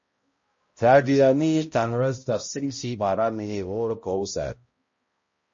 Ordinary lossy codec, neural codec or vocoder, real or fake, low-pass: MP3, 32 kbps; codec, 16 kHz, 0.5 kbps, X-Codec, HuBERT features, trained on balanced general audio; fake; 7.2 kHz